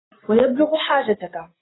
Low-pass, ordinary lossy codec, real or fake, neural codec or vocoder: 7.2 kHz; AAC, 16 kbps; real; none